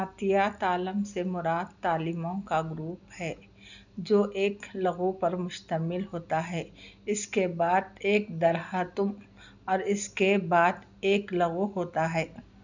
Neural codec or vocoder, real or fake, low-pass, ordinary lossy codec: none; real; 7.2 kHz; none